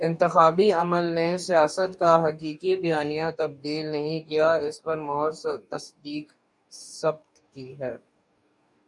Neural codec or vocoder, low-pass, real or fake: codec, 44.1 kHz, 2.6 kbps, DAC; 10.8 kHz; fake